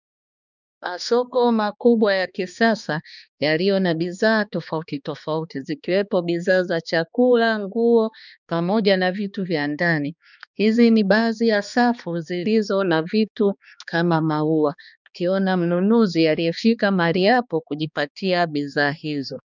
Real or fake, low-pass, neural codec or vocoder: fake; 7.2 kHz; codec, 16 kHz, 2 kbps, X-Codec, HuBERT features, trained on balanced general audio